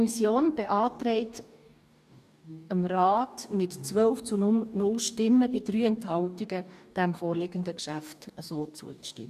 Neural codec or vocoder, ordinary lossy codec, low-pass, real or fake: codec, 44.1 kHz, 2.6 kbps, DAC; none; 14.4 kHz; fake